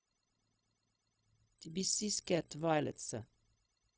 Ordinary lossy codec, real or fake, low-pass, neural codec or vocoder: none; fake; none; codec, 16 kHz, 0.4 kbps, LongCat-Audio-Codec